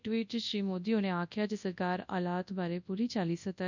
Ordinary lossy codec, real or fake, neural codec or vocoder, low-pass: none; fake; codec, 24 kHz, 0.9 kbps, WavTokenizer, large speech release; 7.2 kHz